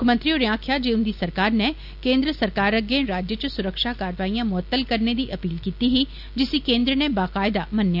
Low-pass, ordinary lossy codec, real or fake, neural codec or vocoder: 5.4 kHz; AAC, 48 kbps; real; none